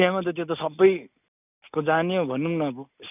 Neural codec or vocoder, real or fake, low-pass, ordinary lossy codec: none; real; 3.6 kHz; none